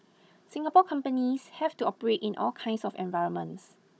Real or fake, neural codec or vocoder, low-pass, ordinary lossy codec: fake; codec, 16 kHz, 16 kbps, FunCodec, trained on Chinese and English, 50 frames a second; none; none